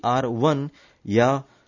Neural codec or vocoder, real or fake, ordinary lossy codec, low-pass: none; real; none; 7.2 kHz